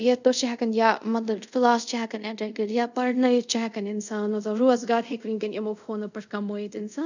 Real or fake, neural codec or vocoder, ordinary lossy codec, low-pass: fake; codec, 24 kHz, 0.5 kbps, DualCodec; none; 7.2 kHz